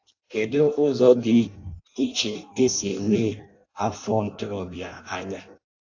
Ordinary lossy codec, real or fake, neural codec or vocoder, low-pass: AAC, 48 kbps; fake; codec, 16 kHz in and 24 kHz out, 0.6 kbps, FireRedTTS-2 codec; 7.2 kHz